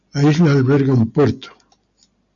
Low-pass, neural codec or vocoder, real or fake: 7.2 kHz; none; real